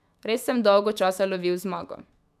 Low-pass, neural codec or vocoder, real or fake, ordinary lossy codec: 14.4 kHz; autoencoder, 48 kHz, 128 numbers a frame, DAC-VAE, trained on Japanese speech; fake; MP3, 96 kbps